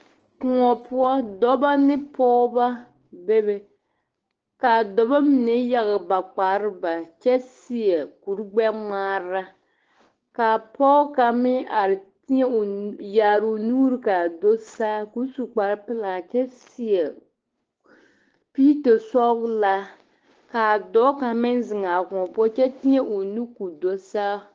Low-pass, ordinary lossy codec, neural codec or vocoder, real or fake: 7.2 kHz; Opus, 16 kbps; codec, 16 kHz, 6 kbps, DAC; fake